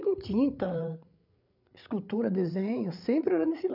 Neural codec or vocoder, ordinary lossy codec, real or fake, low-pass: codec, 16 kHz, 8 kbps, FreqCodec, larger model; none; fake; 5.4 kHz